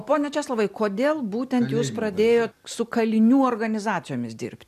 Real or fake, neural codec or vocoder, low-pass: real; none; 14.4 kHz